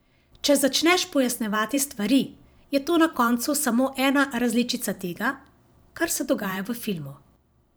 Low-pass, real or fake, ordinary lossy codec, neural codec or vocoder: none; fake; none; vocoder, 44.1 kHz, 128 mel bands every 512 samples, BigVGAN v2